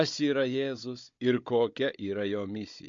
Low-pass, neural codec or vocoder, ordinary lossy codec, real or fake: 7.2 kHz; codec, 16 kHz, 16 kbps, FunCodec, trained on Chinese and English, 50 frames a second; MP3, 48 kbps; fake